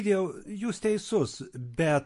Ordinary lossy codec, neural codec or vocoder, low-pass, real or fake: MP3, 48 kbps; none; 14.4 kHz; real